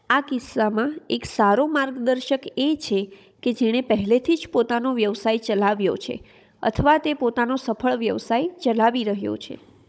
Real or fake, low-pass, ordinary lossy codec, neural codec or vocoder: fake; none; none; codec, 16 kHz, 16 kbps, FunCodec, trained on Chinese and English, 50 frames a second